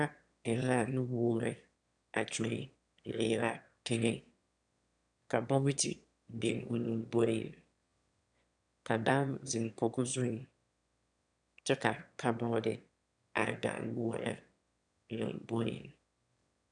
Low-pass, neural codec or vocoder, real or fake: 9.9 kHz; autoencoder, 22.05 kHz, a latent of 192 numbers a frame, VITS, trained on one speaker; fake